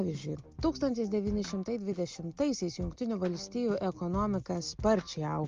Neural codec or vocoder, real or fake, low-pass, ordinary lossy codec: none; real; 7.2 kHz; Opus, 24 kbps